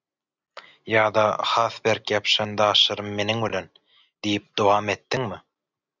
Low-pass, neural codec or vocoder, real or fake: 7.2 kHz; none; real